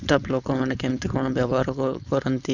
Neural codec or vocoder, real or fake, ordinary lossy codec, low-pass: codec, 16 kHz, 4.8 kbps, FACodec; fake; none; 7.2 kHz